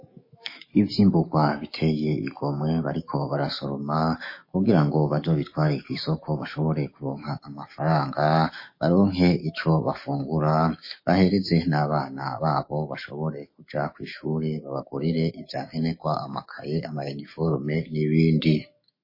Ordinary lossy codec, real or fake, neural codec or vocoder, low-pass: MP3, 24 kbps; fake; autoencoder, 48 kHz, 128 numbers a frame, DAC-VAE, trained on Japanese speech; 5.4 kHz